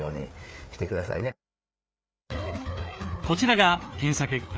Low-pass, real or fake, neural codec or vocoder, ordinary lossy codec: none; fake; codec, 16 kHz, 4 kbps, FreqCodec, larger model; none